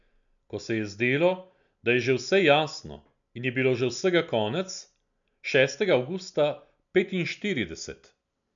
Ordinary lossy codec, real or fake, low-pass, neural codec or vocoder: MP3, 96 kbps; real; 7.2 kHz; none